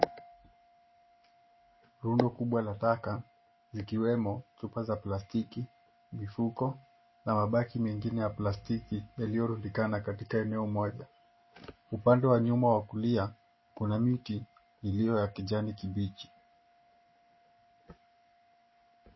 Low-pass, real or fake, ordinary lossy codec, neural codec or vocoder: 7.2 kHz; real; MP3, 24 kbps; none